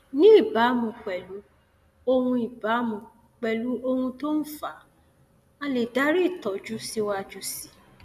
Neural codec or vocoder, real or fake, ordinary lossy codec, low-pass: none; real; none; 14.4 kHz